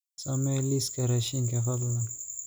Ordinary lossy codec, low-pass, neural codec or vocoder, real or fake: none; none; none; real